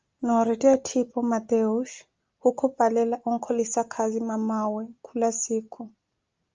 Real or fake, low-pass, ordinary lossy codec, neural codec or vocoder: real; 7.2 kHz; Opus, 24 kbps; none